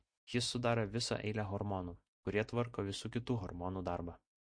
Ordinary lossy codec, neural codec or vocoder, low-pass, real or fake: MP3, 48 kbps; none; 9.9 kHz; real